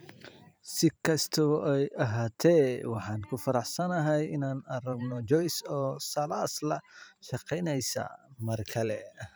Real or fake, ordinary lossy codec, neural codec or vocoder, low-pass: fake; none; vocoder, 44.1 kHz, 128 mel bands every 512 samples, BigVGAN v2; none